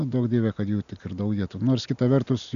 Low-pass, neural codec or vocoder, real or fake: 7.2 kHz; none; real